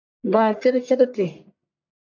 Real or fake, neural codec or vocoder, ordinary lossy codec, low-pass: fake; codec, 44.1 kHz, 1.7 kbps, Pupu-Codec; AAC, 48 kbps; 7.2 kHz